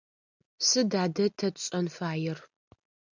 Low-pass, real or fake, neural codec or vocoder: 7.2 kHz; real; none